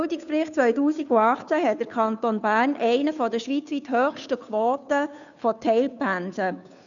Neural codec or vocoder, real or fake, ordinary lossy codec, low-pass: codec, 16 kHz, 2 kbps, FunCodec, trained on Chinese and English, 25 frames a second; fake; none; 7.2 kHz